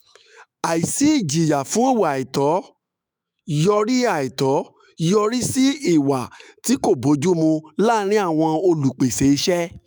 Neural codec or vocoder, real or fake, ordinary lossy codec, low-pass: autoencoder, 48 kHz, 128 numbers a frame, DAC-VAE, trained on Japanese speech; fake; none; none